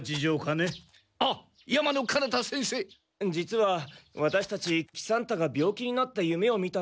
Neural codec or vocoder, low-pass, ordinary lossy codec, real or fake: none; none; none; real